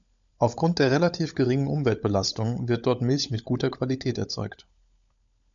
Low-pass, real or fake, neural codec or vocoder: 7.2 kHz; fake; codec, 16 kHz, 16 kbps, FunCodec, trained on LibriTTS, 50 frames a second